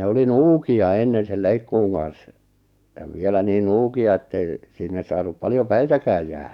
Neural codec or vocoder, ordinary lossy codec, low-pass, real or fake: codec, 44.1 kHz, 7.8 kbps, DAC; none; 19.8 kHz; fake